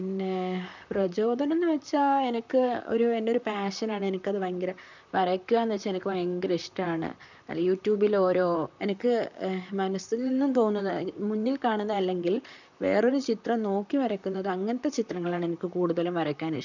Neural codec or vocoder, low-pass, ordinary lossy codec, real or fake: vocoder, 44.1 kHz, 128 mel bands, Pupu-Vocoder; 7.2 kHz; none; fake